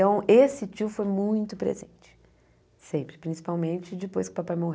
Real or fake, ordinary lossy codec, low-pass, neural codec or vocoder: real; none; none; none